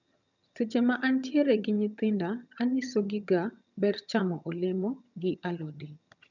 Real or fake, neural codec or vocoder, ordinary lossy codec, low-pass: fake; vocoder, 22.05 kHz, 80 mel bands, HiFi-GAN; none; 7.2 kHz